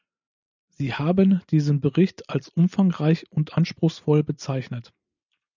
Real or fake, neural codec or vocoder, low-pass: real; none; 7.2 kHz